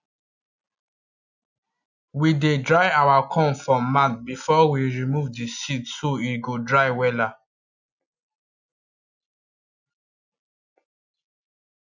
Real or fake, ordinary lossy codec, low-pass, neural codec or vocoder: real; none; 7.2 kHz; none